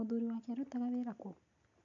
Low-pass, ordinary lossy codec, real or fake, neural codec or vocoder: 7.2 kHz; none; real; none